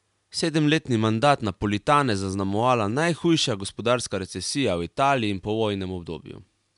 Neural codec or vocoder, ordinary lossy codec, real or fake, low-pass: none; MP3, 96 kbps; real; 10.8 kHz